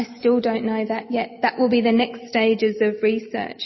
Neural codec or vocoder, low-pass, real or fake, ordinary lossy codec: none; 7.2 kHz; real; MP3, 24 kbps